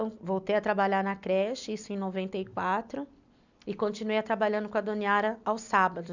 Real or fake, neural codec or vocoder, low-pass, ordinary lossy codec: real; none; 7.2 kHz; none